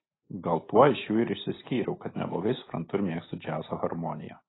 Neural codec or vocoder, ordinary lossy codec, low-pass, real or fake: none; AAC, 16 kbps; 7.2 kHz; real